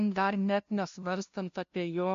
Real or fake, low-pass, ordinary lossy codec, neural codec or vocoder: fake; 7.2 kHz; MP3, 48 kbps; codec, 16 kHz, 0.5 kbps, FunCodec, trained on LibriTTS, 25 frames a second